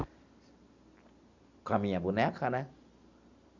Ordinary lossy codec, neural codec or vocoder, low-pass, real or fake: Opus, 64 kbps; none; 7.2 kHz; real